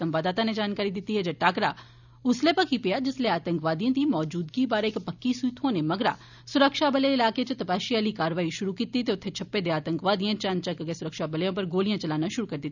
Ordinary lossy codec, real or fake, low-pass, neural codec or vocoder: none; real; 7.2 kHz; none